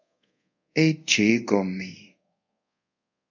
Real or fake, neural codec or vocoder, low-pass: fake; codec, 24 kHz, 0.5 kbps, DualCodec; 7.2 kHz